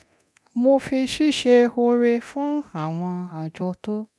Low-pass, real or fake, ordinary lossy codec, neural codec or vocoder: none; fake; none; codec, 24 kHz, 0.9 kbps, DualCodec